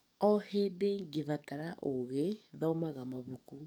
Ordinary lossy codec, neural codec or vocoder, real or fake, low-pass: none; codec, 44.1 kHz, 7.8 kbps, DAC; fake; none